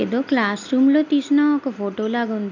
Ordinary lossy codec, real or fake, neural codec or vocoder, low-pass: none; real; none; 7.2 kHz